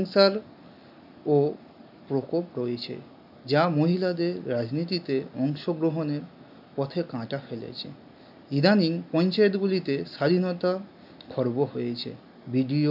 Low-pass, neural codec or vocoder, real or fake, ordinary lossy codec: 5.4 kHz; none; real; none